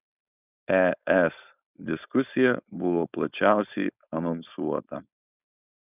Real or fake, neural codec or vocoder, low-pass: fake; codec, 16 kHz, 4.8 kbps, FACodec; 3.6 kHz